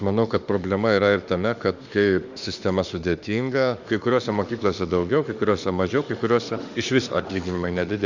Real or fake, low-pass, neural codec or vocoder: fake; 7.2 kHz; autoencoder, 48 kHz, 32 numbers a frame, DAC-VAE, trained on Japanese speech